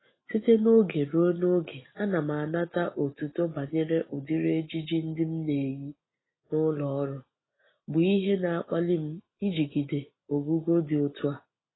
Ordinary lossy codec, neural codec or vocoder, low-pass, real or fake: AAC, 16 kbps; none; 7.2 kHz; real